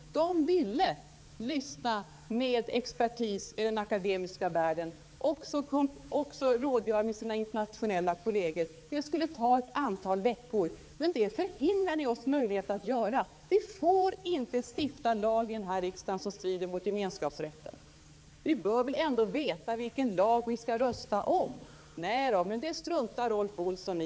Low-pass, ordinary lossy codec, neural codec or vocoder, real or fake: none; none; codec, 16 kHz, 4 kbps, X-Codec, HuBERT features, trained on balanced general audio; fake